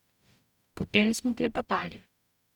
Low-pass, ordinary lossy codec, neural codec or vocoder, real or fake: 19.8 kHz; none; codec, 44.1 kHz, 0.9 kbps, DAC; fake